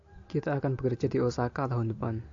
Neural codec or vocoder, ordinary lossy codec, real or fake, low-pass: none; AAC, 64 kbps; real; 7.2 kHz